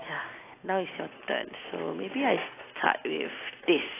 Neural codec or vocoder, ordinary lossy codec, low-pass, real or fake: none; AAC, 16 kbps; 3.6 kHz; real